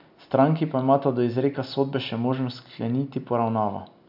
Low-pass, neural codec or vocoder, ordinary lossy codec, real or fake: 5.4 kHz; none; AAC, 48 kbps; real